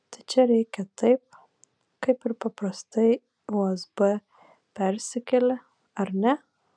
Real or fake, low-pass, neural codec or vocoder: real; 9.9 kHz; none